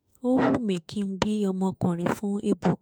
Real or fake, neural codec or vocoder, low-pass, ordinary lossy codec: fake; autoencoder, 48 kHz, 32 numbers a frame, DAC-VAE, trained on Japanese speech; none; none